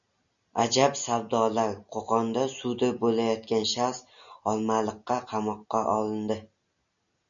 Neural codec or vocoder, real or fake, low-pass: none; real; 7.2 kHz